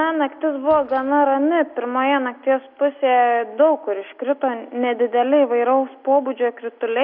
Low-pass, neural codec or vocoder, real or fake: 5.4 kHz; none; real